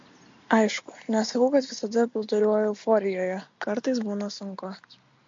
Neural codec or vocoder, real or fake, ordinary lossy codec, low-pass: none; real; MP3, 48 kbps; 7.2 kHz